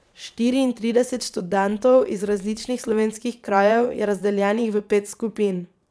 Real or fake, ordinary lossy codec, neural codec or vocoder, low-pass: fake; none; vocoder, 22.05 kHz, 80 mel bands, Vocos; none